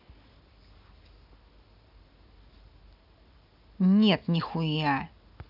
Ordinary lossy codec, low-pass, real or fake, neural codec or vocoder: none; 5.4 kHz; real; none